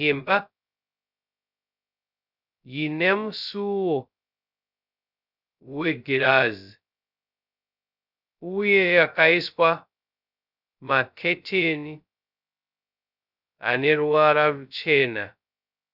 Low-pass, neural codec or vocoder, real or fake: 5.4 kHz; codec, 16 kHz, 0.2 kbps, FocalCodec; fake